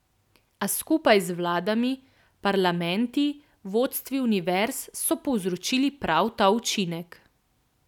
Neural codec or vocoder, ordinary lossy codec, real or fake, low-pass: none; none; real; 19.8 kHz